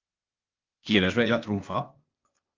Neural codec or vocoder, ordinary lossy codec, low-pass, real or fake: codec, 16 kHz, 0.8 kbps, ZipCodec; Opus, 24 kbps; 7.2 kHz; fake